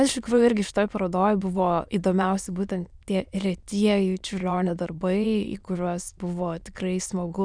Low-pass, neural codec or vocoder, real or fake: 9.9 kHz; autoencoder, 22.05 kHz, a latent of 192 numbers a frame, VITS, trained on many speakers; fake